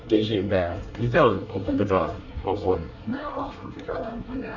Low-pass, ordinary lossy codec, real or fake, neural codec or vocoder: 7.2 kHz; Opus, 64 kbps; fake; codec, 24 kHz, 1 kbps, SNAC